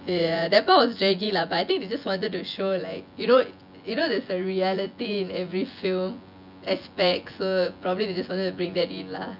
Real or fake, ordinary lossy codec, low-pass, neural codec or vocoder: fake; none; 5.4 kHz; vocoder, 24 kHz, 100 mel bands, Vocos